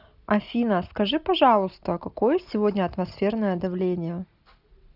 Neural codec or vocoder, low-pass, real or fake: none; 5.4 kHz; real